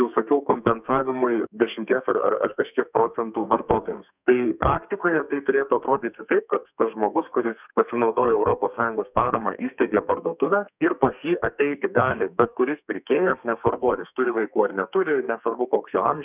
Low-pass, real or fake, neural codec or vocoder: 3.6 kHz; fake; codec, 32 kHz, 1.9 kbps, SNAC